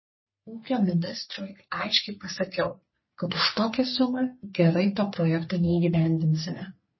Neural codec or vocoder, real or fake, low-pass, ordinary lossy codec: codec, 44.1 kHz, 3.4 kbps, Pupu-Codec; fake; 7.2 kHz; MP3, 24 kbps